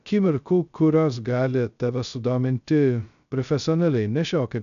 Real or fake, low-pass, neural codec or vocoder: fake; 7.2 kHz; codec, 16 kHz, 0.2 kbps, FocalCodec